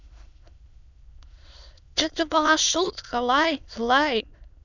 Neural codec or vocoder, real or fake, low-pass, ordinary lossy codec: autoencoder, 22.05 kHz, a latent of 192 numbers a frame, VITS, trained on many speakers; fake; 7.2 kHz; none